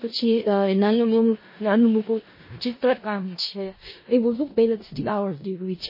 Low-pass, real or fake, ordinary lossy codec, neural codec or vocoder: 5.4 kHz; fake; MP3, 24 kbps; codec, 16 kHz in and 24 kHz out, 0.4 kbps, LongCat-Audio-Codec, four codebook decoder